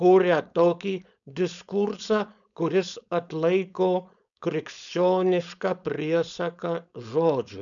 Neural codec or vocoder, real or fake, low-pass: codec, 16 kHz, 4.8 kbps, FACodec; fake; 7.2 kHz